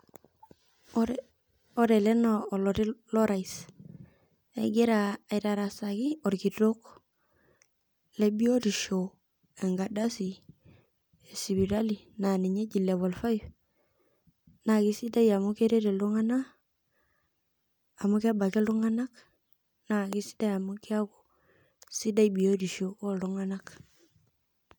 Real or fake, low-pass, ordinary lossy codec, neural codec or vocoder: real; none; none; none